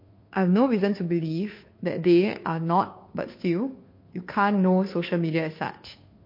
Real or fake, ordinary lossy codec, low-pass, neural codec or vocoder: fake; MP3, 32 kbps; 5.4 kHz; codec, 16 kHz, 2 kbps, FunCodec, trained on Chinese and English, 25 frames a second